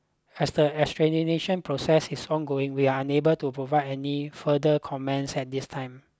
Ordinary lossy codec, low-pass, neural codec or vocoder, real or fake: none; none; none; real